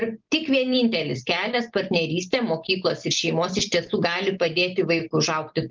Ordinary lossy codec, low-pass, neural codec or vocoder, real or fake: Opus, 32 kbps; 7.2 kHz; none; real